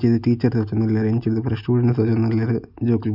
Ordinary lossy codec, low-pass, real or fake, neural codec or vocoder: none; 5.4 kHz; real; none